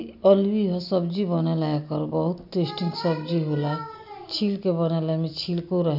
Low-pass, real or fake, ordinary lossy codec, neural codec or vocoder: 5.4 kHz; real; none; none